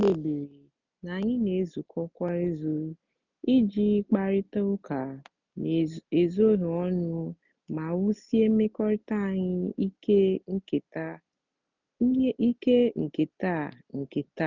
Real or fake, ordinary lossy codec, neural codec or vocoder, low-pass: real; none; none; 7.2 kHz